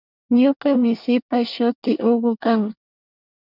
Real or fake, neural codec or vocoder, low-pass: fake; codec, 24 kHz, 1 kbps, SNAC; 5.4 kHz